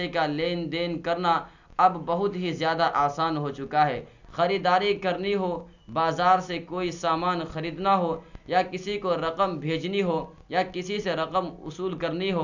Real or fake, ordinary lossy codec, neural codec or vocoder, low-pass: real; none; none; 7.2 kHz